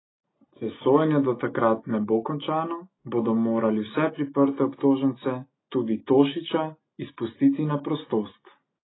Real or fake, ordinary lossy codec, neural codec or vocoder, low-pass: real; AAC, 16 kbps; none; 7.2 kHz